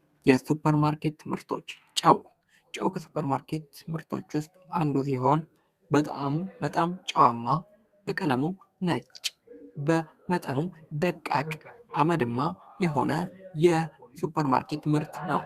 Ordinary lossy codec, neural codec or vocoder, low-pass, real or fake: Opus, 64 kbps; codec, 32 kHz, 1.9 kbps, SNAC; 14.4 kHz; fake